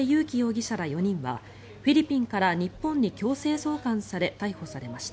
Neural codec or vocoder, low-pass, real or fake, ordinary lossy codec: none; none; real; none